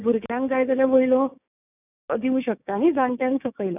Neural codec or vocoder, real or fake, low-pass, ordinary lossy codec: codec, 16 kHz, 6 kbps, DAC; fake; 3.6 kHz; none